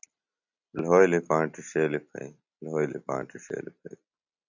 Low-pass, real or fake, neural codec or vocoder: 7.2 kHz; real; none